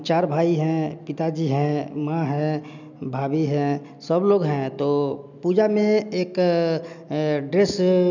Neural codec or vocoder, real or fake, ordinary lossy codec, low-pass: none; real; none; 7.2 kHz